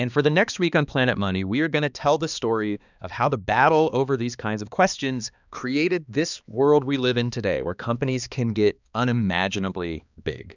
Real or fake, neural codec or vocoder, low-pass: fake; codec, 16 kHz, 2 kbps, X-Codec, HuBERT features, trained on balanced general audio; 7.2 kHz